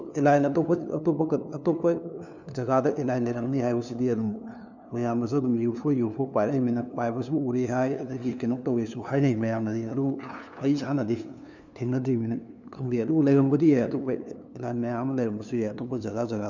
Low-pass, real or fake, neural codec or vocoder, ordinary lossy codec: 7.2 kHz; fake; codec, 16 kHz, 2 kbps, FunCodec, trained on LibriTTS, 25 frames a second; none